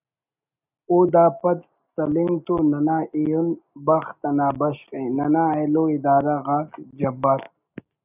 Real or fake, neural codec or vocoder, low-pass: real; none; 3.6 kHz